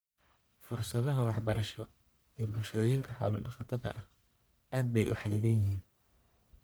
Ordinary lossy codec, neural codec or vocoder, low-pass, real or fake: none; codec, 44.1 kHz, 1.7 kbps, Pupu-Codec; none; fake